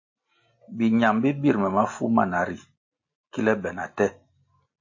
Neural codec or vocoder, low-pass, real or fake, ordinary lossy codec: none; 7.2 kHz; real; MP3, 32 kbps